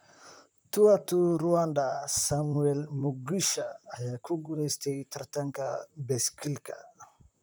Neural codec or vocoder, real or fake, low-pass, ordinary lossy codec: vocoder, 44.1 kHz, 128 mel bands, Pupu-Vocoder; fake; none; none